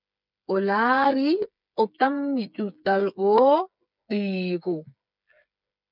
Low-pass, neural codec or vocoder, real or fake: 5.4 kHz; codec, 16 kHz, 8 kbps, FreqCodec, smaller model; fake